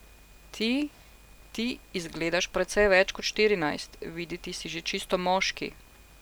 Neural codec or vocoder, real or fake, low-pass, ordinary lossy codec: none; real; none; none